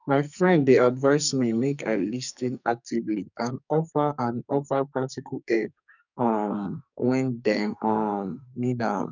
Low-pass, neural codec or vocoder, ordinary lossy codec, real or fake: 7.2 kHz; codec, 44.1 kHz, 2.6 kbps, SNAC; none; fake